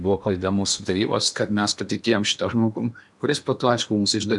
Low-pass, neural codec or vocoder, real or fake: 10.8 kHz; codec, 16 kHz in and 24 kHz out, 0.8 kbps, FocalCodec, streaming, 65536 codes; fake